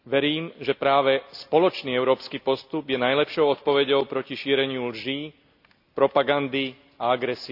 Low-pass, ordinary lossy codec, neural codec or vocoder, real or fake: 5.4 kHz; AAC, 48 kbps; none; real